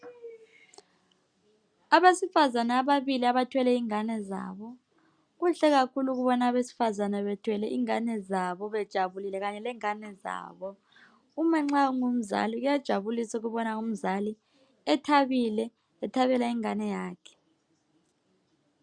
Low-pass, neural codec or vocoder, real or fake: 9.9 kHz; none; real